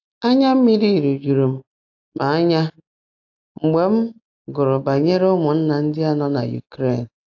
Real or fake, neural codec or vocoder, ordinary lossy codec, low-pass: real; none; AAC, 48 kbps; 7.2 kHz